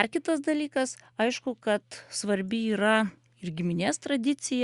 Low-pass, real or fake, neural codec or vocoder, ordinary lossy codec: 10.8 kHz; real; none; Opus, 64 kbps